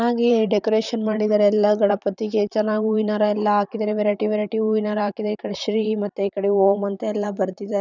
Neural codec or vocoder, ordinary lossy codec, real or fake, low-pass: vocoder, 44.1 kHz, 128 mel bands, Pupu-Vocoder; none; fake; 7.2 kHz